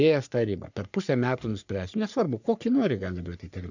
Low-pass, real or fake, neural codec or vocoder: 7.2 kHz; fake; codec, 44.1 kHz, 3.4 kbps, Pupu-Codec